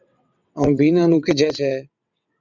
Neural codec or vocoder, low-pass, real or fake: vocoder, 22.05 kHz, 80 mel bands, WaveNeXt; 7.2 kHz; fake